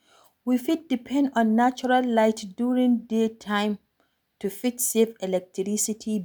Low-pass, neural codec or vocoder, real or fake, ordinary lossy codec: none; none; real; none